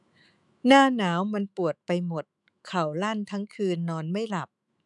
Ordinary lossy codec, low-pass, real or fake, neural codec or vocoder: none; 10.8 kHz; fake; autoencoder, 48 kHz, 128 numbers a frame, DAC-VAE, trained on Japanese speech